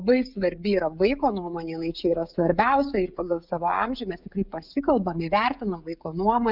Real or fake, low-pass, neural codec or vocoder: fake; 5.4 kHz; codec, 16 kHz, 16 kbps, FreqCodec, smaller model